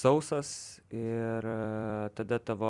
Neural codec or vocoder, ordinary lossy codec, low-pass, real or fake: vocoder, 44.1 kHz, 128 mel bands every 256 samples, BigVGAN v2; Opus, 64 kbps; 10.8 kHz; fake